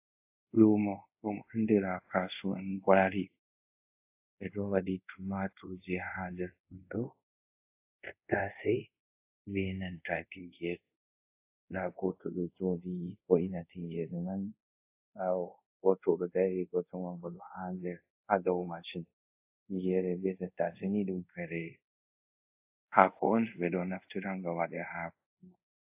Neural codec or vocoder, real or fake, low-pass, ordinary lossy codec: codec, 24 kHz, 0.5 kbps, DualCodec; fake; 3.6 kHz; AAC, 32 kbps